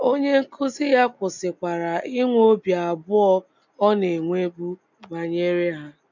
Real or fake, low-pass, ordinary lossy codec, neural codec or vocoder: real; 7.2 kHz; none; none